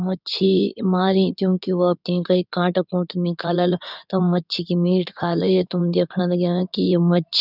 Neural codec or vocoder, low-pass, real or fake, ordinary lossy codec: codec, 16 kHz in and 24 kHz out, 2.2 kbps, FireRedTTS-2 codec; 5.4 kHz; fake; none